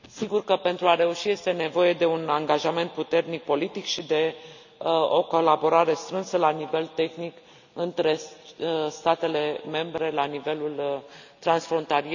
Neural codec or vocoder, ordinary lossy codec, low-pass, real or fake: none; none; 7.2 kHz; real